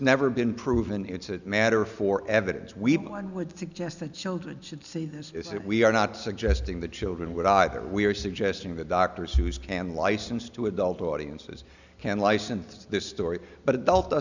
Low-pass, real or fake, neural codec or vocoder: 7.2 kHz; real; none